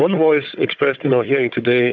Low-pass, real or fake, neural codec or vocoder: 7.2 kHz; fake; codec, 16 kHz, 16 kbps, FunCodec, trained on Chinese and English, 50 frames a second